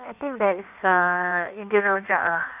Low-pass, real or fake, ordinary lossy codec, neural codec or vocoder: 3.6 kHz; fake; none; codec, 16 kHz in and 24 kHz out, 1.1 kbps, FireRedTTS-2 codec